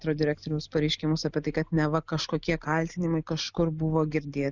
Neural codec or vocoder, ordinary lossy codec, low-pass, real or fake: none; Opus, 64 kbps; 7.2 kHz; real